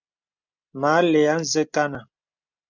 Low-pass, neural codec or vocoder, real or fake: 7.2 kHz; none; real